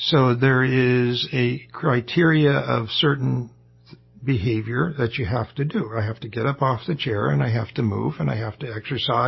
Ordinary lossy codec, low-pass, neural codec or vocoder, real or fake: MP3, 24 kbps; 7.2 kHz; none; real